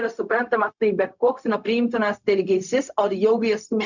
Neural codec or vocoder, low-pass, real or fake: codec, 16 kHz, 0.4 kbps, LongCat-Audio-Codec; 7.2 kHz; fake